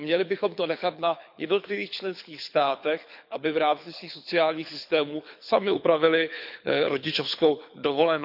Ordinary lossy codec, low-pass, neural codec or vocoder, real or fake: none; 5.4 kHz; codec, 24 kHz, 6 kbps, HILCodec; fake